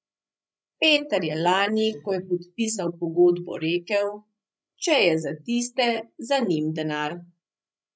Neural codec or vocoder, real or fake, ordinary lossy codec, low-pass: codec, 16 kHz, 16 kbps, FreqCodec, larger model; fake; none; none